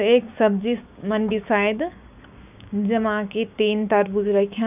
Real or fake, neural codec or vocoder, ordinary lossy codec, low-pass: fake; codec, 16 kHz, 6 kbps, DAC; none; 3.6 kHz